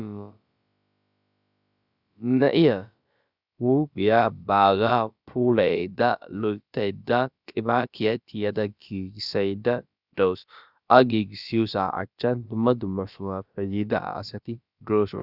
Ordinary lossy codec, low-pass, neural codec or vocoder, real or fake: Opus, 64 kbps; 5.4 kHz; codec, 16 kHz, about 1 kbps, DyCAST, with the encoder's durations; fake